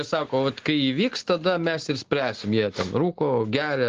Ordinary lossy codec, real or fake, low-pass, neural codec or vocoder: Opus, 16 kbps; real; 7.2 kHz; none